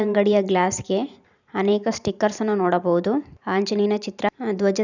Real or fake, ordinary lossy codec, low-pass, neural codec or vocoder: fake; none; 7.2 kHz; vocoder, 44.1 kHz, 128 mel bands every 512 samples, BigVGAN v2